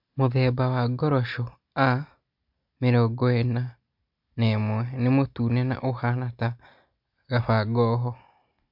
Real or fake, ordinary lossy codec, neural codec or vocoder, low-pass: real; MP3, 48 kbps; none; 5.4 kHz